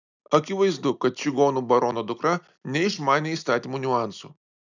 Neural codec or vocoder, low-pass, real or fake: none; 7.2 kHz; real